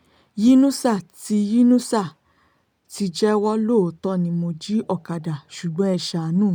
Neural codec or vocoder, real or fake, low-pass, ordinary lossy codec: none; real; none; none